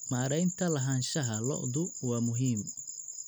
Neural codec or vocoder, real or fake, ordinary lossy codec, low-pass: none; real; none; none